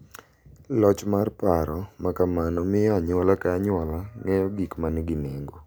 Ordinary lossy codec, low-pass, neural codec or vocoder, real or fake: none; none; none; real